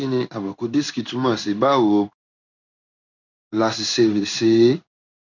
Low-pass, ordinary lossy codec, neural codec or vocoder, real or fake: 7.2 kHz; none; codec, 16 kHz in and 24 kHz out, 1 kbps, XY-Tokenizer; fake